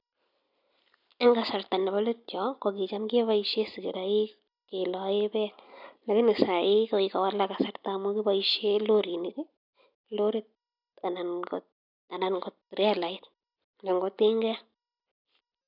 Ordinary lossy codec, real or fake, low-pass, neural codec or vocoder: none; real; 5.4 kHz; none